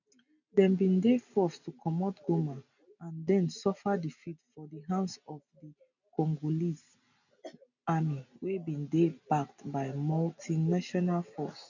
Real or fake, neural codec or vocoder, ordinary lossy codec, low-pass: real; none; none; 7.2 kHz